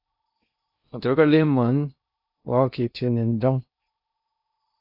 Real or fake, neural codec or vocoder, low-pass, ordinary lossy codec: fake; codec, 16 kHz in and 24 kHz out, 0.6 kbps, FocalCodec, streaming, 2048 codes; 5.4 kHz; AAC, 48 kbps